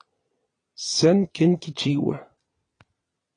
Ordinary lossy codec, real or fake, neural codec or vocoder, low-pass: AAC, 32 kbps; fake; vocoder, 22.05 kHz, 80 mel bands, Vocos; 9.9 kHz